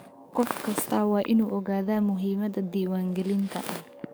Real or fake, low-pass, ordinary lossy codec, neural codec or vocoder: fake; none; none; codec, 44.1 kHz, 7.8 kbps, DAC